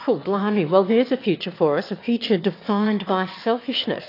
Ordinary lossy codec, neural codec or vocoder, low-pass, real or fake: AAC, 32 kbps; autoencoder, 22.05 kHz, a latent of 192 numbers a frame, VITS, trained on one speaker; 5.4 kHz; fake